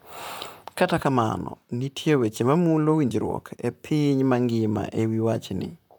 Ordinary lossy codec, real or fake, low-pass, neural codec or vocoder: none; fake; none; vocoder, 44.1 kHz, 128 mel bands, Pupu-Vocoder